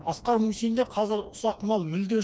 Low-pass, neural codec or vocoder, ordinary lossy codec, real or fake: none; codec, 16 kHz, 2 kbps, FreqCodec, smaller model; none; fake